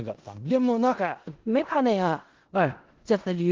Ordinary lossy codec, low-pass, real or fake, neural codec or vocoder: Opus, 16 kbps; 7.2 kHz; fake; codec, 16 kHz in and 24 kHz out, 0.4 kbps, LongCat-Audio-Codec, four codebook decoder